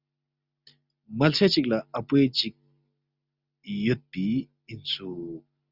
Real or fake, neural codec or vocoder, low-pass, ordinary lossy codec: real; none; 5.4 kHz; Opus, 64 kbps